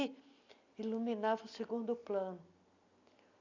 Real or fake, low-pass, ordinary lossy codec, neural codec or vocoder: real; 7.2 kHz; none; none